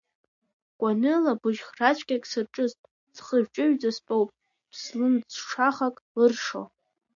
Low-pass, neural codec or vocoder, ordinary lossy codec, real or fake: 7.2 kHz; none; AAC, 64 kbps; real